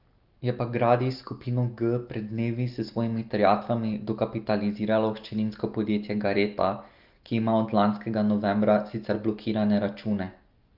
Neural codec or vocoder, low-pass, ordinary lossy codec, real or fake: none; 5.4 kHz; Opus, 32 kbps; real